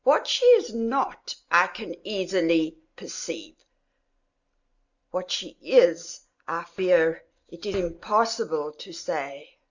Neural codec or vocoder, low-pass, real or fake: none; 7.2 kHz; real